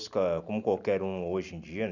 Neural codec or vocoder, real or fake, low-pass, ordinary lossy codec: none; real; 7.2 kHz; none